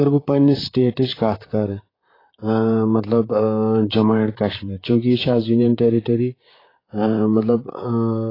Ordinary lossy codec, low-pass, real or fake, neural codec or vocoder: AAC, 24 kbps; 5.4 kHz; fake; vocoder, 44.1 kHz, 128 mel bands, Pupu-Vocoder